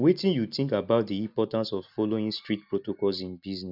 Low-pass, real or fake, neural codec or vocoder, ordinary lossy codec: 5.4 kHz; real; none; none